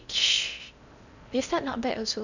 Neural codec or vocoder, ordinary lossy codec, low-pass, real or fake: codec, 16 kHz in and 24 kHz out, 0.6 kbps, FocalCodec, streaming, 4096 codes; none; 7.2 kHz; fake